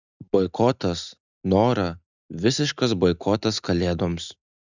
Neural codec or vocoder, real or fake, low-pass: none; real; 7.2 kHz